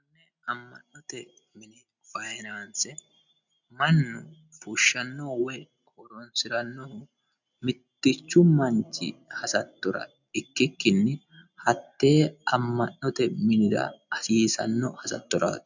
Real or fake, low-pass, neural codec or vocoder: real; 7.2 kHz; none